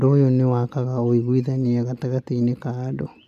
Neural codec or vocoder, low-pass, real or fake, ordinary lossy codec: none; 14.4 kHz; real; none